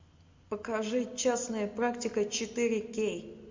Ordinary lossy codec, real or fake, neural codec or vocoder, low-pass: MP3, 48 kbps; real; none; 7.2 kHz